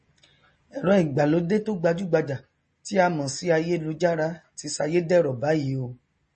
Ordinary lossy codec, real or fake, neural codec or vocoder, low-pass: MP3, 32 kbps; real; none; 10.8 kHz